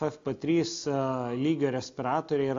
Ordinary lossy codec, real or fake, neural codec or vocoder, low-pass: AAC, 48 kbps; real; none; 7.2 kHz